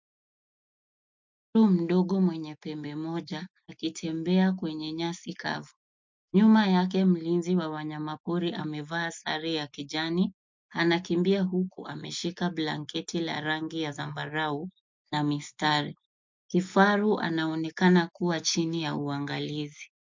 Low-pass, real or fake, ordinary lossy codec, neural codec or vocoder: 7.2 kHz; real; MP3, 64 kbps; none